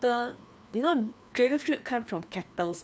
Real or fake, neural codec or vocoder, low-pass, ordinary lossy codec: fake; codec, 16 kHz, 2 kbps, FreqCodec, larger model; none; none